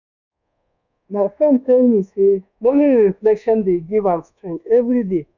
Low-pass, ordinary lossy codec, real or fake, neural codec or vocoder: 7.2 kHz; none; fake; codec, 24 kHz, 1.2 kbps, DualCodec